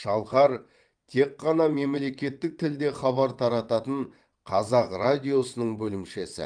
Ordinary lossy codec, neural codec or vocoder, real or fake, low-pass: Opus, 32 kbps; vocoder, 22.05 kHz, 80 mel bands, WaveNeXt; fake; 9.9 kHz